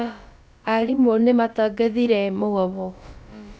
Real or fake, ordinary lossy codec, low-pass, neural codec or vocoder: fake; none; none; codec, 16 kHz, about 1 kbps, DyCAST, with the encoder's durations